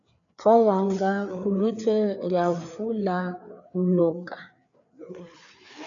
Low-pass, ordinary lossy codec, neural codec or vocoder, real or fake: 7.2 kHz; MP3, 64 kbps; codec, 16 kHz, 4 kbps, FreqCodec, larger model; fake